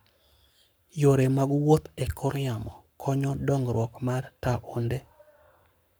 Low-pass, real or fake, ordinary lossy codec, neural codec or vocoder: none; fake; none; codec, 44.1 kHz, 7.8 kbps, Pupu-Codec